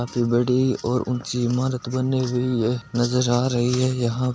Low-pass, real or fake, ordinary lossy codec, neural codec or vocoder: none; real; none; none